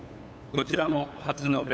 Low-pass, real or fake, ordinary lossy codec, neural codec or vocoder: none; fake; none; codec, 16 kHz, 8 kbps, FunCodec, trained on LibriTTS, 25 frames a second